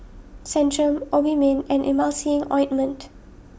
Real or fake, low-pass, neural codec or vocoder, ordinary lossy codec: real; none; none; none